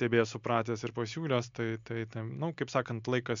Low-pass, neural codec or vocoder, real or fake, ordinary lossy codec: 7.2 kHz; none; real; MP3, 64 kbps